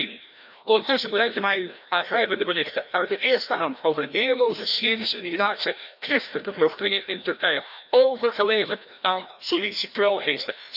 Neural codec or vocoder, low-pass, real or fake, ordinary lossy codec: codec, 16 kHz, 1 kbps, FreqCodec, larger model; 5.4 kHz; fake; none